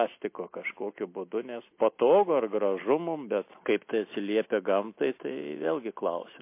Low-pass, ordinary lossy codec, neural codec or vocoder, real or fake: 3.6 kHz; MP3, 24 kbps; none; real